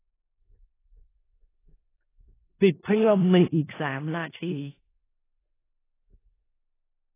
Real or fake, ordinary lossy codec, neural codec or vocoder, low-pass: fake; AAC, 16 kbps; codec, 16 kHz in and 24 kHz out, 0.4 kbps, LongCat-Audio-Codec, four codebook decoder; 3.6 kHz